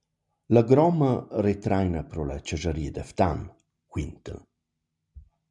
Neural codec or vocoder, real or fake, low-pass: none; real; 10.8 kHz